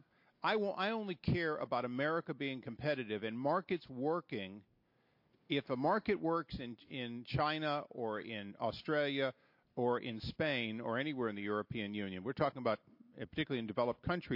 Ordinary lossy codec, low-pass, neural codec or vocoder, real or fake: MP3, 32 kbps; 5.4 kHz; none; real